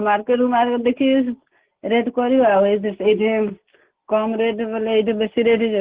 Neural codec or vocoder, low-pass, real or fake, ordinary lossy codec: none; 3.6 kHz; real; Opus, 16 kbps